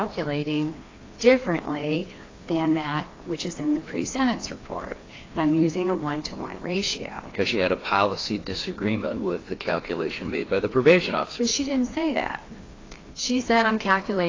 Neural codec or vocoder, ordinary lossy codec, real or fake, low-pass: codec, 16 kHz, 2 kbps, FreqCodec, larger model; AAC, 32 kbps; fake; 7.2 kHz